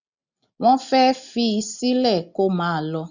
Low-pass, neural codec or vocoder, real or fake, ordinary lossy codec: 7.2 kHz; none; real; none